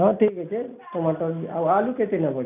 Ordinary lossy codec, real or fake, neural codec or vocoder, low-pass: none; real; none; 3.6 kHz